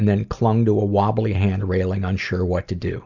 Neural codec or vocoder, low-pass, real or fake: none; 7.2 kHz; real